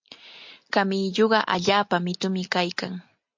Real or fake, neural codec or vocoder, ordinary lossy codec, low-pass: real; none; MP3, 48 kbps; 7.2 kHz